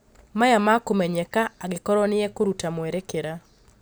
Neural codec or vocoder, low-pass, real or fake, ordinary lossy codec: none; none; real; none